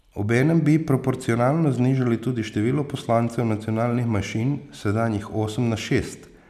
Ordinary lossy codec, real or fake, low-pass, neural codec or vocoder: none; real; 14.4 kHz; none